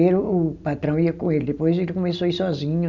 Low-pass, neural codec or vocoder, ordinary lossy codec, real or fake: 7.2 kHz; none; none; real